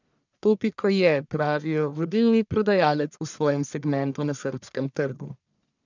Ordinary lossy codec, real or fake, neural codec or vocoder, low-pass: none; fake; codec, 44.1 kHz, 1.7 kbps, Pupu-Codec; 7.2 kHz